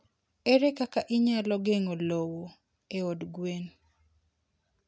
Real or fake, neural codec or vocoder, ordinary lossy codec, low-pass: real; none; none; none